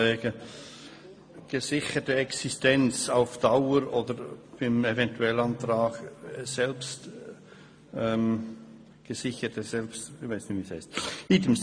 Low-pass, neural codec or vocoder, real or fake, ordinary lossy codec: 9.9 kHz; none; real; MP3, 48 kbps